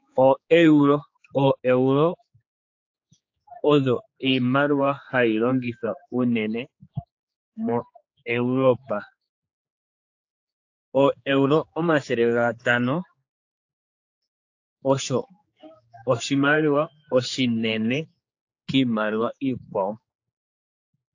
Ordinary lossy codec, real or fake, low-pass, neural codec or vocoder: AAC, 48 kbps; fake; 7.2 kHz; codec, 16 kHz, 4 kbps, X-Codec, HuBERT features, trained on general audio